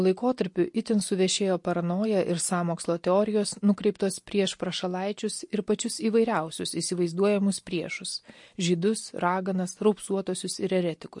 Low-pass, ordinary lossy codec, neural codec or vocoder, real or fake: 10.8 kHz; MP3, 48 kbps; none; real